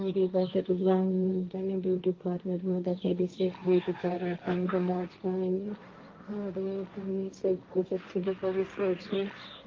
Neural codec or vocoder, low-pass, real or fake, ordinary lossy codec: codec, 16 kHz, 1.1 kbps, Voila-Tokenizer; 7.2 kHz; fake; Opus, 32 kbps